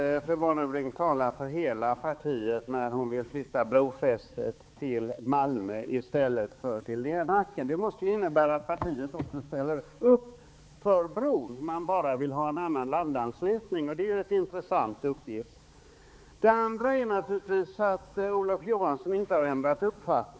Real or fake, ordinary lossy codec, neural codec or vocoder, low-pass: fake; none; codec, 16 kHz, 4 kbps, X-Codec, HuBERT features, trained on balanced general audio; none